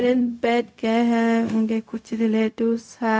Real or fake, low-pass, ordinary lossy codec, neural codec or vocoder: fake; none; none; codec, 16 kHz, 0.4 kbps, LongCat-Audio-Codec